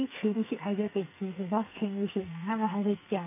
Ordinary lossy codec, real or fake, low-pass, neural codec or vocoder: none; fake; 3.6 kHz; codec, 44.1 kHz, 2.6 kbps, SNAC